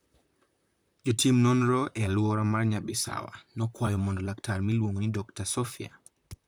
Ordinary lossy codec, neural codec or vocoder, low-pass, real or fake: none; vocoder, 44.1 kHz, 128 mel bands, Pupu-Vocoder; none; fake